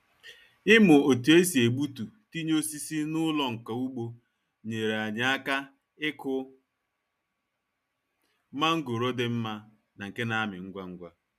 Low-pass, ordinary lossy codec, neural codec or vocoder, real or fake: 14.4 kHz; none; none; real